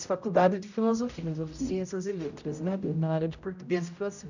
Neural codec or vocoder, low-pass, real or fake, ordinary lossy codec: codec, 16 kHz, 0.5 kbps, X-Codec, HuBERT features, trained on general audio; 7.2 kHz; fake; none